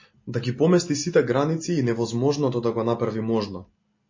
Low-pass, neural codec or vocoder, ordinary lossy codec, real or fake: 7.2 kHz; none; MP3, 32 kbps; real